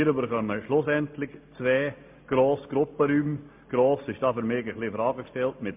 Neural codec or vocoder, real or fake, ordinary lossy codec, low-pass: none; real; MP3, 32 kbps; 3.6 kHz